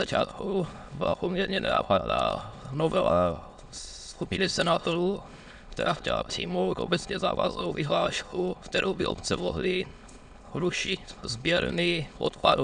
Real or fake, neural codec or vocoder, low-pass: fake; autoencoder, 22.05 kHz, a latent of 192 numbers a frame, VITS, trained on many speakers; 9.9 kHz